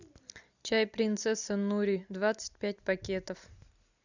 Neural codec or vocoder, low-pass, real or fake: none; 7.2 kHz; real